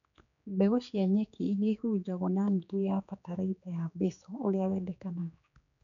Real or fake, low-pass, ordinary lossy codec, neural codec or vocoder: fake; 7.2 kHz; none; codec, 16 kHz, 4 kbps, X-Codec, HuBERT features, trained on general audio